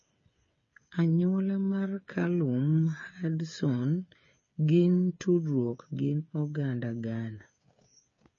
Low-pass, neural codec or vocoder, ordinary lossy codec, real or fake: 7.2 kHz; codec, 16 kHz, 8 kbps, FreqCodec, smaller model; MP3, 32 kbps; fake